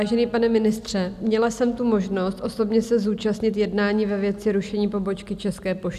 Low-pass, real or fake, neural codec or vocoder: 14.4 kHz; real; none